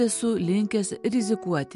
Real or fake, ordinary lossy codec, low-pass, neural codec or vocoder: real; MP3, 64 kbps; 10.8 kHz; none